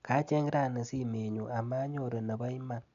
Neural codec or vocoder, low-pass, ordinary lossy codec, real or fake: none; 7.2 kHz; none; real